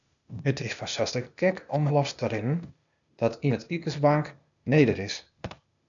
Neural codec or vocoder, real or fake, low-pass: codec, 16 kHz, 0.8 kbps, ZipCodec; fake; 7.2 kHz